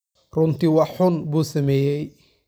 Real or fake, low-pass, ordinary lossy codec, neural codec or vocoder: real; none; none; none